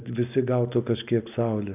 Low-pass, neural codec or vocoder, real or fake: 3.6 kHz; none; real